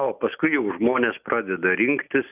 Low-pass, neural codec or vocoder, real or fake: 3.6 kHz; none; real